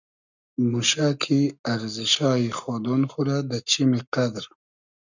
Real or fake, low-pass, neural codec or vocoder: fake; 7.2 kHz; codec, 44.1 kHz, 7.8 kbps, Pupu-Codec